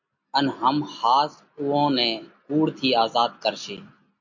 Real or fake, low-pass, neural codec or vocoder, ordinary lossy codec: real; 7.2 kHz; none; MP3, 64 kbps